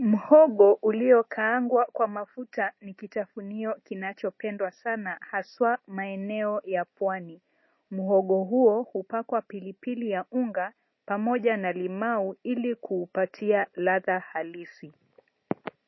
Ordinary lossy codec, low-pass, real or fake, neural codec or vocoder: MP3, 24 kbps; 7.2 kHz; real; none